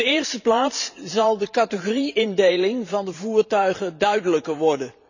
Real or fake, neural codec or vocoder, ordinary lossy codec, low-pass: fake; vocoder, 44.1 kHz, 128 mel bands every 512 samples, BigVGAN v2; none; 7.2 kHz